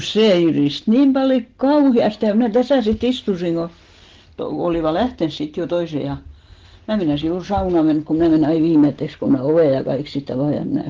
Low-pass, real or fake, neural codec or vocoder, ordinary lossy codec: 7.2 kHz; real; none; Opus, 16 kbps